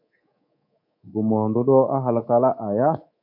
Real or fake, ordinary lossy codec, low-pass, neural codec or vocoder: fake; MP3, 32 kbps; 5.4 kHz; codec, 24 kHz, 3.1 kbps, DualCodec